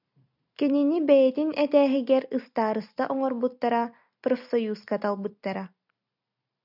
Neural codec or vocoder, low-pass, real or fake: none; 5.4 kHz; real